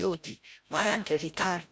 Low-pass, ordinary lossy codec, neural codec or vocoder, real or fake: none; none; codec, 16 kHz, 0.5 kbps, FreqCodec, larger model; fake